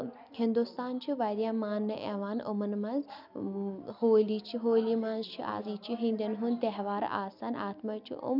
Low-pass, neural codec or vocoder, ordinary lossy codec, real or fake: 5.4 kHz; none; none; real